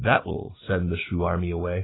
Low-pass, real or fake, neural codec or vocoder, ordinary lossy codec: 7.2 kHz; real; none; AAC, 16 kbps